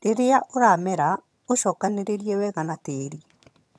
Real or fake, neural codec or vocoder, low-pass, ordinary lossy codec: fake; vocoder, 22.05 kHz, 80 mel bands, HiFi-GAN; none; none